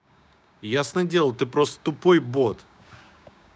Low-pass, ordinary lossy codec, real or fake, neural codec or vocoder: none; none; fake; codec, 16 kHz, 6 kbps, DAC